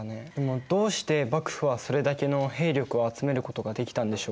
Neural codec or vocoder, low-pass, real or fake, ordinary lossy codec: none; none; real; none